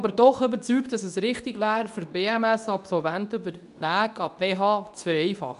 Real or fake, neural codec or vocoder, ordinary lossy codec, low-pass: fake; codec, 24 kHz, 0.9 kbps, WavTokenizer, medium speech release version 2; none; 10.8 kHz